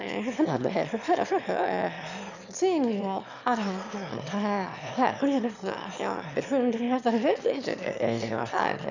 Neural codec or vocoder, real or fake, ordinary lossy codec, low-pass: autoencoder, 22.05 kHz, a latent of 192 numbers a frame, VITS, trained on one speaker; fake; none; 7.2 kHz